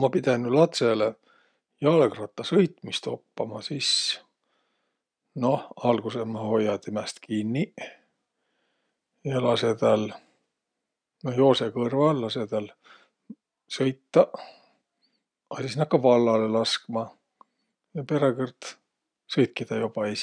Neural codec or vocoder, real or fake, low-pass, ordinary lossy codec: none; real; 9.9 kHz; none